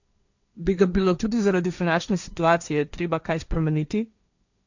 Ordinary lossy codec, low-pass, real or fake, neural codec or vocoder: none; 7.2 kHz; fake; codec, 16 kHz, 1.1 kbps, Voila-Tokenizer